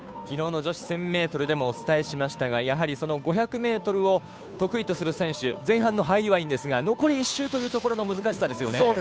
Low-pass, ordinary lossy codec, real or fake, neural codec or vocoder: none; none; fake; codec, 16 kHz, 2 kbps, FunCodec, trained on Chinese and English, 25 frames a second